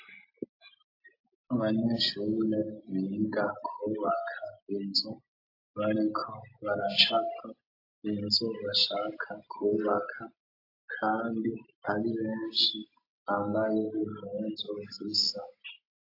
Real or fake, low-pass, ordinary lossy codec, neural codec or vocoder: real; 5.4 kHz; AAC, 32 kbps; none